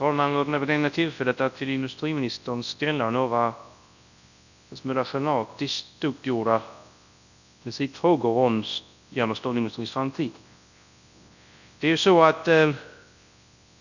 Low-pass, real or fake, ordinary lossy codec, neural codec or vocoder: 7.2 kHz; fake; none; codec, 24 kHz, 0.9 kbps, WavTokenizer, large speech release